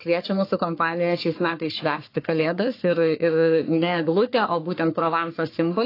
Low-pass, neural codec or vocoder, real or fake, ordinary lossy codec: 5.4 kHz; codec, 44.1 kHz, 3.4 kbps, Pupu-Codec; fake; AAC, 32 kbps